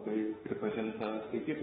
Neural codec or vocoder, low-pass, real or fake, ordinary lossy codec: autoencoder, 48 kHz, 32 numbers a frame, DAC-VAE, trained on Japanese speech; 19.8 kHz; fake; AAC, 16 kbps